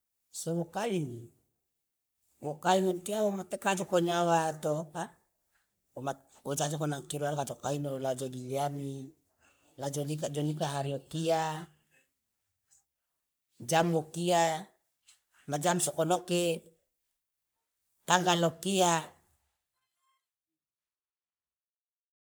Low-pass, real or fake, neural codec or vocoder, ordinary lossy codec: none; fake; codec, 44.1 kHz, 3.4 kbps, Pupu-Codec; none